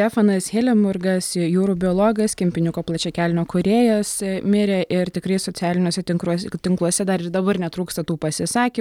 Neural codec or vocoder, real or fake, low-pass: none; real; 19.8 kHz